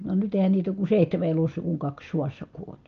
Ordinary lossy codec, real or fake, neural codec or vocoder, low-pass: Opus, 24 kbps; real; none; 7.2 kHz